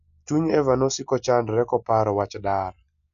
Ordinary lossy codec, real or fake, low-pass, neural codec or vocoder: none; real; 7.2 kHz; none